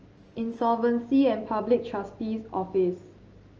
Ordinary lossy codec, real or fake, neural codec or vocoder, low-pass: Opus, 24 kbps; real; none; 7.2 kHz